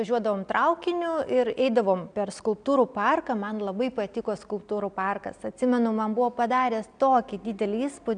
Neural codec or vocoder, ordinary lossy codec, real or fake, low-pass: none; MP3, 96 kbps; real; 9.9 kHz